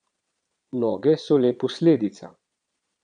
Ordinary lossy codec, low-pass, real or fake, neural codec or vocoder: none; 9.9 kHz; fake; vocoder, 22.05 kHz, 80 mel bands, Vocos